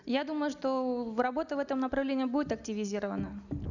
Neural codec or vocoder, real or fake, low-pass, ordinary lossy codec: none; real; 7.2 kHz; none